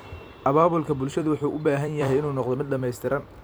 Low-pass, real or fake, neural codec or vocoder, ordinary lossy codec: none; fake; vocoder, 44.1 kHz, 128 mel bands every 256 samples, BigVGAN v2; none